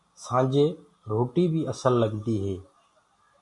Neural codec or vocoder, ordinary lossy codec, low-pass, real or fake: none; MP3, 64 kbps; 10.8 kHz; real